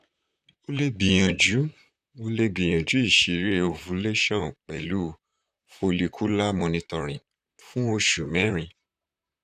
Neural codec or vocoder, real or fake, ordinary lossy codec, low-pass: vocoder, 44.1 kHz, 128 mel bands, Pupu-Vocoder; fake; none; 14.4 kHz